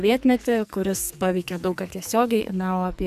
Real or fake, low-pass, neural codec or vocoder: fake; 14.4 kHz; codec, 44.1 kHz, 2.6 kbps, SNAC